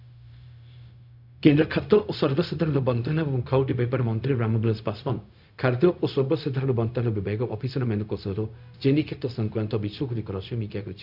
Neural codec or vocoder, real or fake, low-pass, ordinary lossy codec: codec, 16 kHz, 0.4 kbps, LongCat-Audio-Codec; fake; 5.4 kHz; none